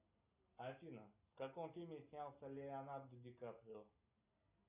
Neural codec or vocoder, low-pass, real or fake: none; 3.6 kHz; real